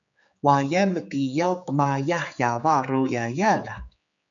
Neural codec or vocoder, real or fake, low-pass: codec, 16 kHz, 4 kbps, X-Codec, HuBERT features, trained on general audio; fake; 7.2 kHz